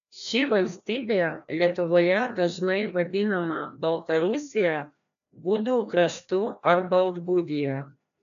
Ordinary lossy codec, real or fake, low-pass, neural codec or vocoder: MP3, 96 kbps; fake; 7.2 kHz; codec, 16 kHz, 1 kbps, FreqCodec, larger model